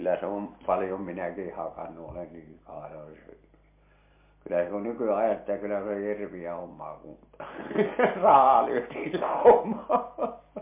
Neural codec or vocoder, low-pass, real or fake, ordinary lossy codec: none; 3.6 kHz; real; MP3, 24 kbps